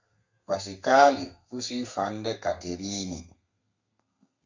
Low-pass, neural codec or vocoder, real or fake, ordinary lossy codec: 7.2 kHz; codec, 32 kHz, 1.9 kbps, SNAC; fake; MP3, 64 kbps